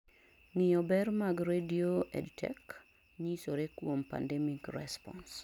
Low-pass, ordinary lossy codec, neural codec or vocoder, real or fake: 19.8 kHz; none; none; real